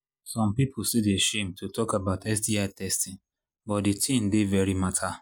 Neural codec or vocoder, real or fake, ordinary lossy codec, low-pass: none; real; none; none